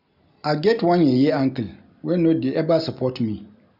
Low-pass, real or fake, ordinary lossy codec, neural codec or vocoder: 5.4 kHz; real; none; none